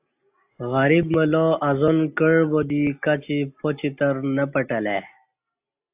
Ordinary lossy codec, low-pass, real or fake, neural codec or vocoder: AAC, 32 kbps; 3.6 kHz; real; none